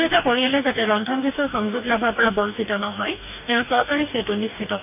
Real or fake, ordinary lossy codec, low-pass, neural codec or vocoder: fake; none; 3.6 kHz; codec, 44.1 kHz, 2.6 kbps, DAC